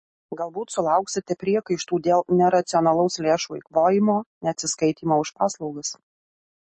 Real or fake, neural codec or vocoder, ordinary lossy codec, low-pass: real; none; MP3, 32 kbps; 10.8 kHz